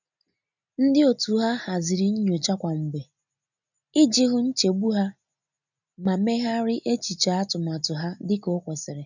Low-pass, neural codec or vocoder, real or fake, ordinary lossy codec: 7.2 kHz; none; real; none